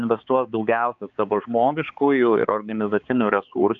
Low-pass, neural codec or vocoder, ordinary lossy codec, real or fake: 7.2 kHz; codec, 16 kHz, 4 kbps, X-Codec, HuBERT features, trained on balanced general audio; AAC, 64 kbps; fake